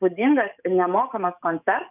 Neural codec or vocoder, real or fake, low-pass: codec, 44.1 kHz, 7.8 kbps, DAC; fake; 3.6 kHz